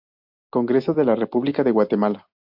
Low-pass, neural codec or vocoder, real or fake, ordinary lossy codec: 5.4 kHz; none; real; AAC, 48 kbps